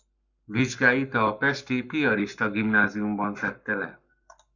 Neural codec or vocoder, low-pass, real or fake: codec, 44.1 kHz, 7.8 kbps, Pupu-Codec; 7.2 kHz; fake